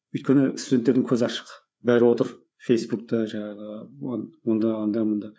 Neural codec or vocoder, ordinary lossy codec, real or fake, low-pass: codec, 16 kHz, 4 kbps, FreqCodec, larger model; none; fake; none